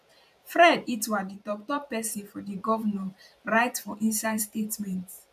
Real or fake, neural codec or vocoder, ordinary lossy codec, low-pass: fake; vocoder, 44.1 kHz, 128 mel bands every 512 samples, BigVGAN v2; MP3, 96 kbps; 14.4 kHz